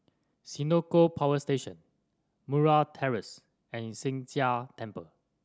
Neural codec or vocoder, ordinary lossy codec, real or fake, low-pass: none; none; real; none